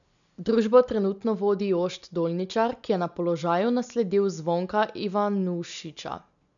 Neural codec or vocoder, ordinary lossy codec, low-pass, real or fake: none; none; 7.2 kHz; real